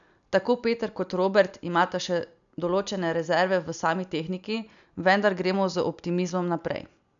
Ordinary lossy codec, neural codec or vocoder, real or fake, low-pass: none; none; real; 7.2 kHz